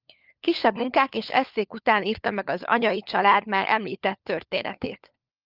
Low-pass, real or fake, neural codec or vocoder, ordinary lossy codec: 5.4 kHz; fake; codec, 16 kHz, 4 kbps, FunCodec, trained on LibriTTS, 50 frames a second; Opus, 24 kbps